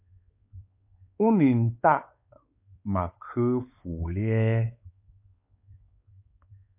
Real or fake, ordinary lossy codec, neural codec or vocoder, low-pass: fake; MP3, 32 kbps; codec, 16 kHz, 4 kbps, X-Codec, WavLM features, trained on Multilingual LibriSpeech; 3.6 kHz